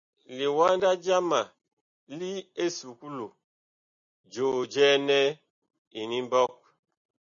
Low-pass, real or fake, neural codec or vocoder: 7.2 kHz; real; none